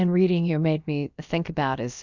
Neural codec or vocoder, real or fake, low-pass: codec, 16 kHz, about 1 kbps, DyCAST, with the encoder's durations; fake; 7.2 kHz